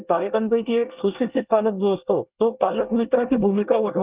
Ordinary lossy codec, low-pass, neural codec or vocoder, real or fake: Opus, 24 kbps; 3.6 kHz; codec, 24 kHz, 1 kbps, SNAC; fake